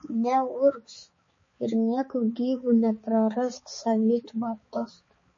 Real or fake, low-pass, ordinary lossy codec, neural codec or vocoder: fake; 7.2 kHz; MP3, 32 kbps; codec, 16 kHz, 4 kbps, X-Codec, HuBERT features, trained on balanced general audio